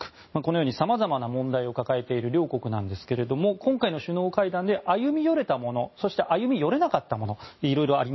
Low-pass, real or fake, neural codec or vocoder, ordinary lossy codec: 7.2 kHz; real; none; MP3, 24 kbps